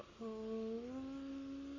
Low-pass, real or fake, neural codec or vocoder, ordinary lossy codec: 7.2 kHz; fake; codec, 44.1 kHz, 7.8 kbps, Pupu-Codec; MP3, 48 kbps